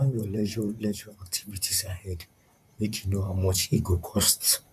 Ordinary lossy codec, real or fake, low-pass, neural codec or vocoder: none; fake; 14.4 kHz; vocoder, 44.1 kHz, 128 mel bands, Pupu-Vocoder